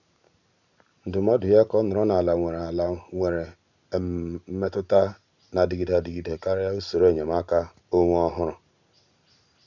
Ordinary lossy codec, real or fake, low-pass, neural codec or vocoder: none; real; 7.2 kHz; none